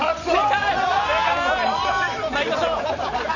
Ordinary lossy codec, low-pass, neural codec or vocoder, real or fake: none; 7.2 kHz; vocoder, 44.1 kHz, 128 mel bands every 512 samples, BigVGAN v2; fake